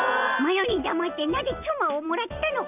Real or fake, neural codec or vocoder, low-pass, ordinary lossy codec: real; none; 3.6 kHz; none